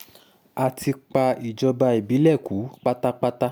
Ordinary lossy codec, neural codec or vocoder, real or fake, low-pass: none; none; real; none